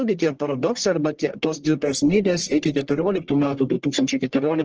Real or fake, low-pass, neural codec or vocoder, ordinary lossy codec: fake; 7.2 kHz; codec, 44.1 kHz, 1.7 kbps, Pupu-Codec; Opus, 16 kbps